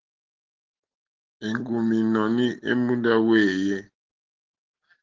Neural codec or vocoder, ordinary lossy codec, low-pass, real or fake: none; Opus, 16 kbps; 7.2 kHz; real